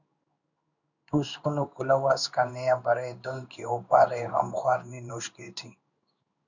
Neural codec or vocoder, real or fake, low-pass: codec, 16 kHz in and 24 kHz out, 1 kbps, XY-Tokenizer; fake; 7.2 kHz